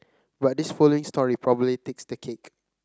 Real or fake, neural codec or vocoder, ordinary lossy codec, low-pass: real; none; none; none